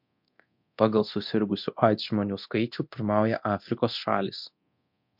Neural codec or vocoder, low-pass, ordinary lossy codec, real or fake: codec, 24 kHz, 0.9 kbps, DualCodec; 5.4 kHz; MP3, 48 kbps; fake